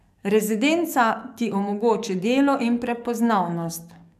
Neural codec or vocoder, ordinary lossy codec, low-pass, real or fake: codec, 44.1 kHz, 7.8 kbps, DAC; none; 14.4 kHz; fake